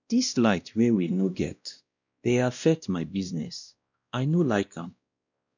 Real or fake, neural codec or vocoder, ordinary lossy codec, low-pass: fake; codec, 16 kHz, 1 kbps, X-Codec, WavLM features, trained on Multilingual LibriSpeech; none; 7.2 kHz